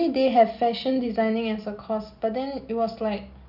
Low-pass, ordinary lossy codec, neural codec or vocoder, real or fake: 5.4 kHz; none; none; real